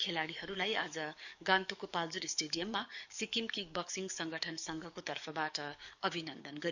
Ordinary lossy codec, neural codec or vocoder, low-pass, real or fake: none; codec, 44.1 kHz, 7.8 kbps, DAC; 7.2 kHz; fake